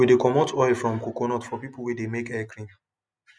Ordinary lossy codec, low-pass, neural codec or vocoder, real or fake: none; none; none; real